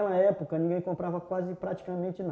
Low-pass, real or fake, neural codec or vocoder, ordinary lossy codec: none; real; none; none